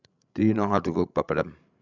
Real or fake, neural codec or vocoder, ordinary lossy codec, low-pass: fake; codec, 16 kHz, 16 kbps, FreqCodec, larger model; none; 7.2 kHz